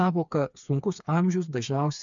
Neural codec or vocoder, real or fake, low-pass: codec, 16 kHz, 4 kbps, FreqCodec, smaller model; fake; 7.2 kHz